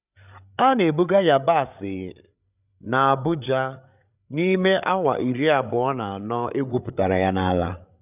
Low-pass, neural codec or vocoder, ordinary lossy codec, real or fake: 3.6 kHz; codec, 16 kHz, 8 kbps, FreqCodec, larger model; none; fake